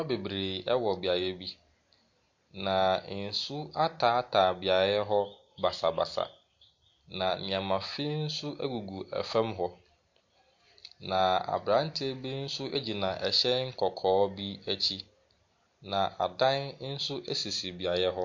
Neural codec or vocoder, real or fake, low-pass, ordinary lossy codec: none; real; 7.2 kHz; MP3, 48 kbps